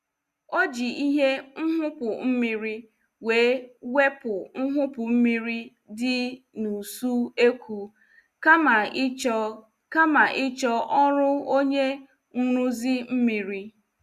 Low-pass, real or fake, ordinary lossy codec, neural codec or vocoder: 14.4 kHz; real; Opus, 64 kbps; none